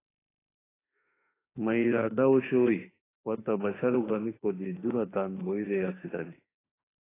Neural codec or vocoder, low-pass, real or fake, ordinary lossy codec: autoencoder, 48 kHz, 32 numbers a frame, DAC-VAE, trained on Japanese speech; 3.6 kHz; fake; AAC, 16 kbps